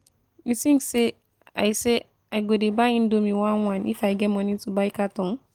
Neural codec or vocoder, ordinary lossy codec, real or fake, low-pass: none; Opus, 24 kbps; real; 19.8 kHz